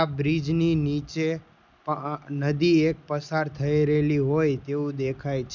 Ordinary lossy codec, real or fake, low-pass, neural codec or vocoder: none; real; 7.2 kHz; none